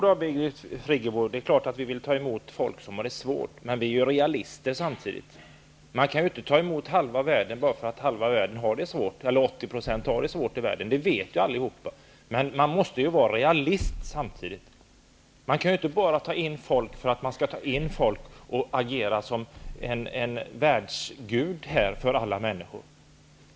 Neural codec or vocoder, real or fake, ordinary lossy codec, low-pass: none; real; none; none